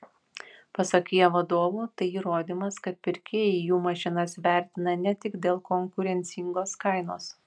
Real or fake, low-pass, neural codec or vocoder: real; 9.9 kHz; none